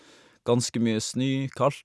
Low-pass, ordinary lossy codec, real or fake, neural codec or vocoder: none; none; real; none